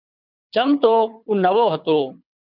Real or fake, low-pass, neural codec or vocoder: fake; 5.4 kHz; codec, 24 kHz, 6 kbps, HILCodec